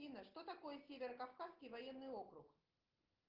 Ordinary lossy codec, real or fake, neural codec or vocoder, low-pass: Opus, 16 kbps; real; none; 5.4 kHz